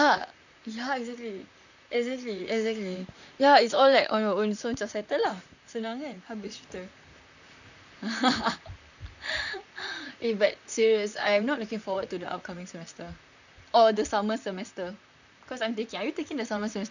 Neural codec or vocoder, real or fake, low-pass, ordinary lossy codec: vocoder, 44.1 kHz, 128 mel bands, Pupu-Vocoder; fake; 7.2 kHz; none